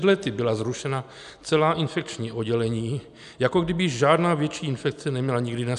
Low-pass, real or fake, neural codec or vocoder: 10.8 kHz; real; none